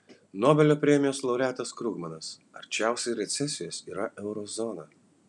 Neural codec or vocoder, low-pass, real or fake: none; 10.8 kHz; real